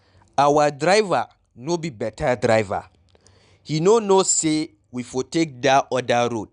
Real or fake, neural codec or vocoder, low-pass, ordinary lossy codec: real; none; 9.9 kHz; none